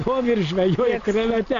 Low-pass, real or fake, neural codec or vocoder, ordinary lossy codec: 7.2 kHz; real; none; AAC, 64 kbps